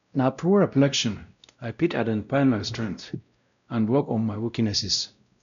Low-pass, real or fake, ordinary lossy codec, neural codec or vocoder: 7.2 kHz; fake; none; codec, 16 kHz, 0.5 kbps, X-Codec, WavLM features, trained on Multilingual LibriSpeech